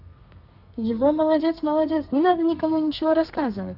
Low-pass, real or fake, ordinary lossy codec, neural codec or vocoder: 5.4 kHz; fake; none; codec, 32 kHz, 1.9 kbps, SNAC